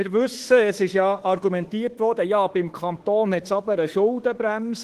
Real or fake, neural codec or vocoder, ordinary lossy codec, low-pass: fake; autoencoder, 48 kHz, 32 numbers a frame, DAC-VAE, trained on Japanese speech; Opus, 16 kbps; 14.4 kHz